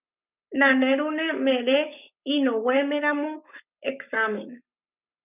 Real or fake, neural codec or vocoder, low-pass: fake; codec, 44.1 kHz, 7.8 kbps, Pupu-Codec; 3.6 kHz